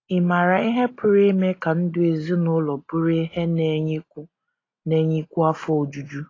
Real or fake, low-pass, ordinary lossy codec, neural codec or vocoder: real; 7.2 kHz; AAC, 32 kbps; none